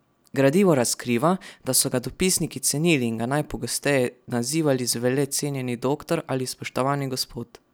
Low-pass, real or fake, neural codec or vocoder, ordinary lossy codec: none; real; none; none